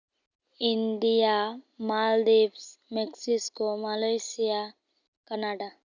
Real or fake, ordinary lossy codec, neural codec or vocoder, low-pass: real; none; none; 7.2 kHz